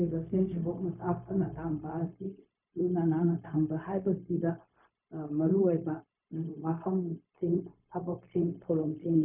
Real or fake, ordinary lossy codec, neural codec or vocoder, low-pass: fake; none; codec, 16 kHz, 0.4 kbps, LongCat-Audio-Codec; 3.6 kHz